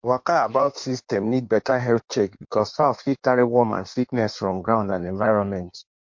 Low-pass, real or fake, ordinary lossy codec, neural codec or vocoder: 7.2 kHz; fake; MP3, 48 kbps; codec, 16 kHz in and 24 kHz out, 1.1 kbps, FireRedTTS-2 codec